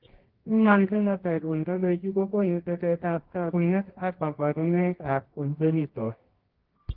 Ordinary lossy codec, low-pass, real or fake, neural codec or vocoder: Opus, 16 kbps; 5.4 kHz; fake; codec, 24 kHz, 0.9 kbps, WavTokenizer, medium music audio release